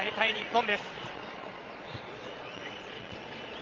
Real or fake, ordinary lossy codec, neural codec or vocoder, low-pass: fake; Opus, 32 kbps; vocoder, 22.05 kHz, 80 mel bands, Vocos; 7.2 kHz